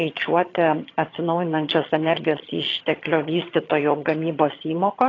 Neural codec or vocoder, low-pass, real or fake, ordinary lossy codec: vocoder, 22.05 kHz, 80 mel bands, HiFi-GAN; 7.2 kHz; fake; AAC, 32 kbps